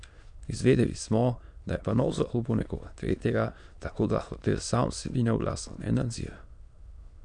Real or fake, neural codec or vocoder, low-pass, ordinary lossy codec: fake; autoencoder, 22.05 kHz, a latent of 192 numbers a frame, VITS, trained on many speakers; 9.9 kHz; none